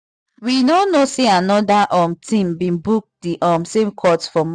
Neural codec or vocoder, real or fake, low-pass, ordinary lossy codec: none; real; none; none